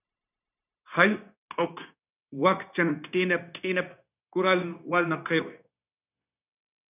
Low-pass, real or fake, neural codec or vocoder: 3.6 kHz; fake; codec, 16 kHz, 0.9 kbps, LongCat-Audio-Codec